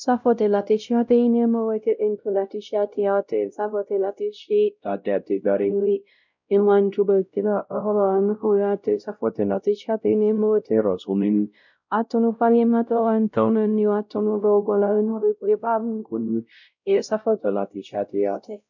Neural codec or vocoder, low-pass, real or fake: codec, 16 kHz, 0.5 kbps, X-Codec, WavLM features, trained on Multilingual LibriSpeech; 7.2 kHz; fake